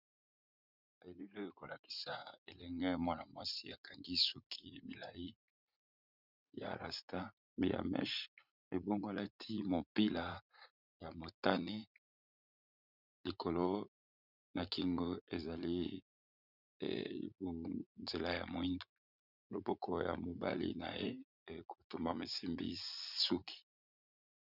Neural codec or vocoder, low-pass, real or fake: vocoder, 44.1 kHz, 80 mel bands, Vocos; 5.4 kHz; fake